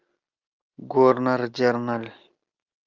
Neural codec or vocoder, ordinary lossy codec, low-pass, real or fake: none; Opus, 24 kbps; 7.2 kHz; real